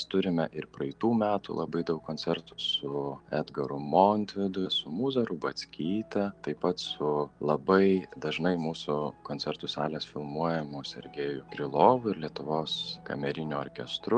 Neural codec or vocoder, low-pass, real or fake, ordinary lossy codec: none; 9.9 kHz; real; Opus, 32 kbps